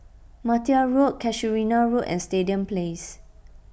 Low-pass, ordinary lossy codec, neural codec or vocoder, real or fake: none; none; none; real